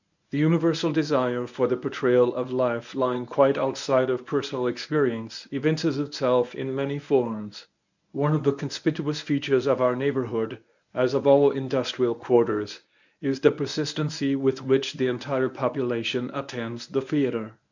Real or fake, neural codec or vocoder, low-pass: fake; codec, 24 kHz, 0.9 kbps, WavTokenizer, medium speech release version 1; 7.2 kHz